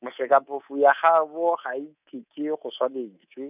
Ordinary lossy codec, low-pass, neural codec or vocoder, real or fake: none; 3.6 kHz; codec, 24 kHz, 3.1 kbps, DualCodec; fake